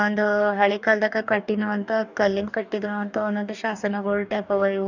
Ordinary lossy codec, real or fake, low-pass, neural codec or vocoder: none; fake; 7.2 kHz; codec, 44.1 kHz, 2.6 kbps, DAC